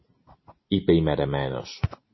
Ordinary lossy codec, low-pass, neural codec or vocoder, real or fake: MP3, 24 kbps; 7.2 kHz; vocoder, 44.1 kHz, 128 mel bands every 512 samples, BigVGAN v2; fake